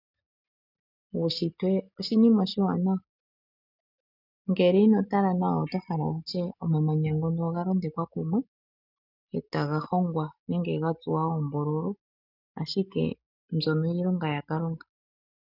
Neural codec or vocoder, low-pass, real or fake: none; 5.4 kHz; real